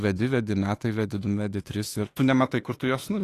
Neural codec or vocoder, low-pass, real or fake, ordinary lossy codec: autoencoder, 48 kHz, 32 numbers a frame, DAC-VAE, trained on Japanese speech; 14.4 kHz; fake; AAC, 48 kbps